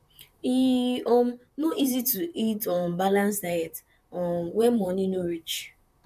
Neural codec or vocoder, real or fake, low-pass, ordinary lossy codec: vocoder, 44.1 kHz, 128 mel bands, Pupu-Vocoder; fake; 14.4 kHz; none